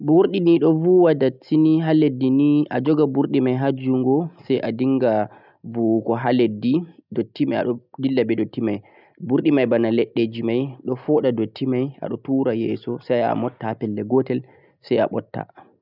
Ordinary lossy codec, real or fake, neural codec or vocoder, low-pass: none; real; none; 5.4 kHz